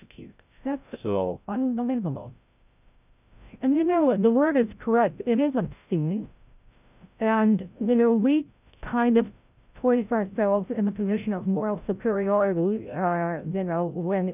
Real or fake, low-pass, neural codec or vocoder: fake; 3.6 kHz; codec, 16 kHz, 0.5 kbps, FreqCodec, larger model